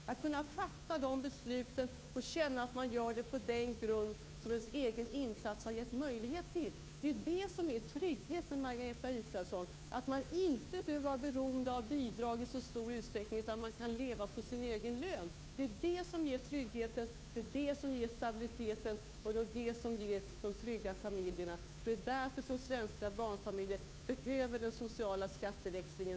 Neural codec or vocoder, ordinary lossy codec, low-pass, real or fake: codec, 16 kHz, 2 kbps, FunCodec, trained on Chinese and English, 25 frames a second; none; none; fake